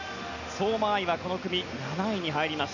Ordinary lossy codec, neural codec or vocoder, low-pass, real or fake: none; none; 7.2 kHz; real